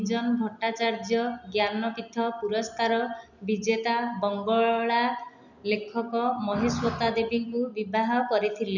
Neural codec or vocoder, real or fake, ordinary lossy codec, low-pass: none; real; none; 7.2 kHz